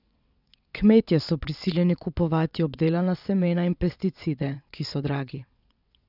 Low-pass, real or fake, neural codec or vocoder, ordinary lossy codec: 5.4 kHz; fake; vocoder, 22.05 kHz, 80 mel bands, WaveNeXt; none